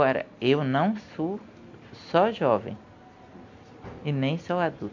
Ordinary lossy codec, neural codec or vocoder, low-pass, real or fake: MP3, 64 kbps; none; 7.2 kHz; real